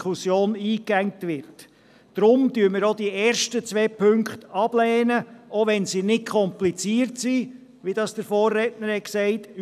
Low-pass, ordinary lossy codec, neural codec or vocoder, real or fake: 14.4 kHz; none; none; real